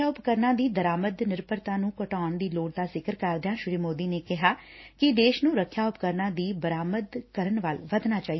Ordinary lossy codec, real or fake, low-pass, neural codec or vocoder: MP3, 24 kbps; real; 7.2 kHz; none